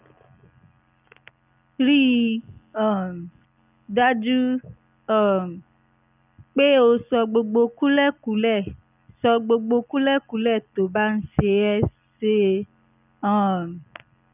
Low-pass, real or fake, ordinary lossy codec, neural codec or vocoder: 3.6 kHz; real; none; none